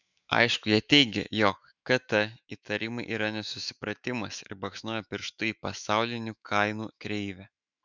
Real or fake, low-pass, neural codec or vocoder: fake; 7.2 kHz; autoencoder, 48 kHz, 128 numbers a frame, DAC-VAE, trained on Japanese speech